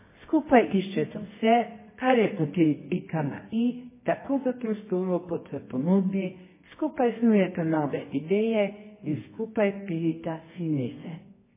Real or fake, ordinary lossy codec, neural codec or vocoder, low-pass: fake; MP3, 16 kbps; codec, 24 kHz, 0.9 kbps, WavTokenizer, medium music audio release; 3.6 kHz